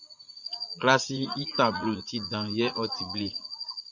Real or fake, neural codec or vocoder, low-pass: fake; vocoder, 44.1 kHz, 80 mel bands, Vocos; 7.2 kHz